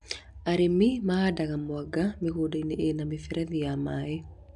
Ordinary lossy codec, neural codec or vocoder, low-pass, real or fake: none; none; 10.8 kHz; real